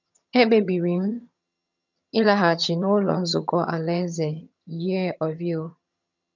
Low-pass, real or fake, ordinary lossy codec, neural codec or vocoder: 7.2 kHz; fake; none; vocoder, 22.05 kHz, 80 mel bands, HiFi-GAN